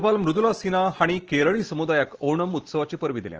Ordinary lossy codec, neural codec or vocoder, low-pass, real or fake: Opus, 16 kbps; none; 7.2 kHz; real